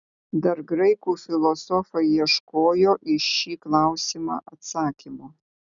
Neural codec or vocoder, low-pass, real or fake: none; 7.2 kHz; real